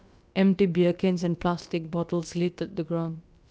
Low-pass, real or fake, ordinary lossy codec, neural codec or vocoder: none; fake; none; codec, 16 kHz, about 1 kbps, DyCAST, with the encoder's durations